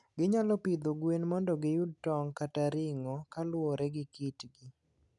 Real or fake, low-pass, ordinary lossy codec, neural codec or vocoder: real; 10.8 kHz; none; none